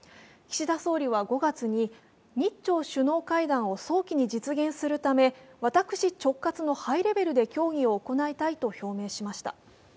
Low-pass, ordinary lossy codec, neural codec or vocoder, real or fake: none; none; none; real